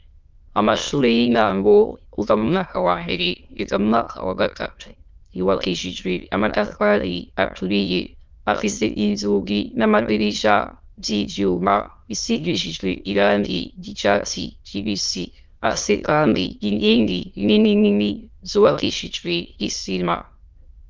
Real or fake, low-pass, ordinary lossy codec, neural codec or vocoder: fake; 7.2 kHz; Opus, 24 kbps; autoencoder, 22.05 kHz, a latent of 192 numbers a frame, VITS, trained on many speakers